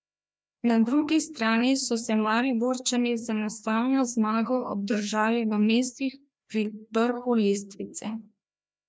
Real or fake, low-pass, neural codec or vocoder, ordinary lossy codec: fake; none; codec, 16 kHz, 1 kbps, FreqCodec, larger model; none